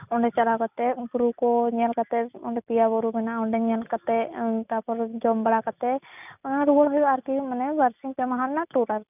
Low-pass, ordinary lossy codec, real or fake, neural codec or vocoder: 3.6 kHz; none; real; none